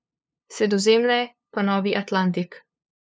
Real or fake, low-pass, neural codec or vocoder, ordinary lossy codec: fake; none; codec, 16 kHz, 2 kbps, FunCodec, trained on LibriTTS, 25 frames a second; none